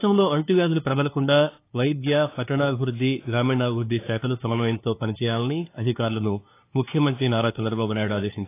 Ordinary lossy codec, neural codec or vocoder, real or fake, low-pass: AAC, 24 kbps; codec, 16 kHz, 2 kbps, FunCodec, trained on LibriTTS, 25 frames a second; fake; 3.6 kHz